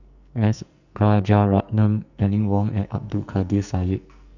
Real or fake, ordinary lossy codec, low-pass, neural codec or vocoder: fake; none; 7.2 kHz; codec, 44.1 kHz, 2.6 kbps, SNAC